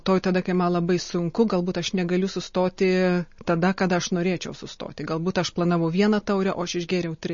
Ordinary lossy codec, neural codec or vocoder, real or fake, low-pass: MP3, 32 kbps; none; real; 7.2 kHz